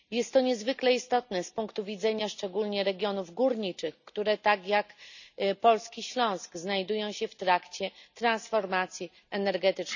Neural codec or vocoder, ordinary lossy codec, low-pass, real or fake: none; none; 7.2 kHz; real